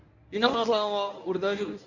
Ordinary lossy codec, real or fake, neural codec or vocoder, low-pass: none; fake; codec, 24 kHz, 0.9 kbps, WavTokenizer, medium speech release version 1; 7.2 kHz